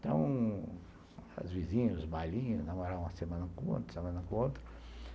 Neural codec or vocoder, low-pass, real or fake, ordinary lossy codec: none; none; real; none